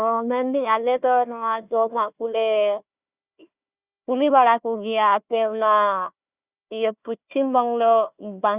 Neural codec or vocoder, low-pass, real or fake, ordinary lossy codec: codec, 16 kHz, 1 kbps, FunCodec, trained on Chinese and English, 50 frames a second; 3.6 kHz; fake; Opus, 64 kbps